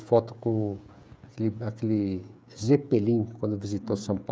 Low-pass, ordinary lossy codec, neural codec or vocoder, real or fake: none; none; codec, 16 kHz, 16 kbps, FreqCodec, smaller model; fake